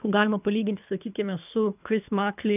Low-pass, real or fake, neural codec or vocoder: 3.6 kHz; fake; codec, 24 kHz, 3 kbps, HILCodec